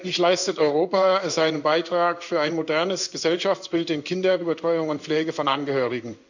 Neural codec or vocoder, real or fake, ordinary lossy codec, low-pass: codec, 16 kHz in and 24 kHz out, 1 kbps, XY-Tokenizer; fake; none; 7.2 kHz